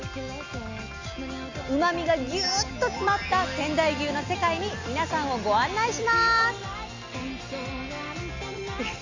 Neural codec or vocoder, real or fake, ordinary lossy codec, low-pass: none; real; AAC, 48 kbps; 7.2 kHz